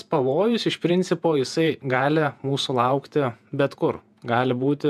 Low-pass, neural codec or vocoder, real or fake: 14.4 kHz; vocoder, 48 kHz, 128 mel bands, Vocos; fake